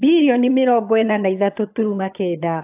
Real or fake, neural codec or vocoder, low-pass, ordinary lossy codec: fake; vocoder, 22.05 kHz, 80 mel bands, HiFi-GAN; 3.6 kHz; AAC, 24 kbps